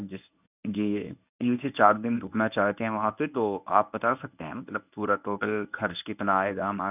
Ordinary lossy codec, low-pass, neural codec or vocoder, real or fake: none; 3.6 kHz; codec, 24 kHz, 0.9 kbps, WavTokenizer, medium speech release version 1; fake